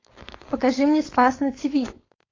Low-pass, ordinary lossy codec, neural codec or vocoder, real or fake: 7.2 kHz; AAC, 32 kbps; codec, 16 kHz, 4.8 kbps, FACodec; fake